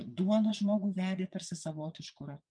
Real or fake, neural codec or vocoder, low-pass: fake; vocoder, 22.05 kHz, 80 mel bands, WaveNeXt; 9.9 kHz